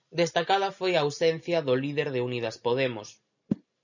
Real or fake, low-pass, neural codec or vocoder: real; 7.2 kHz; none